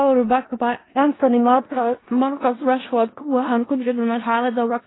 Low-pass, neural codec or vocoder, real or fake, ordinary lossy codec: 7.2 kHz; codec, 16 kHz in and 24 kHz out, 0.4 kbps, LongCat-Audio-Codec, four codebook decoder; fake; AAC, 16 kbps